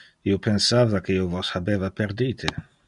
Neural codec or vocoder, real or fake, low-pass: none; real; 10.8 kHz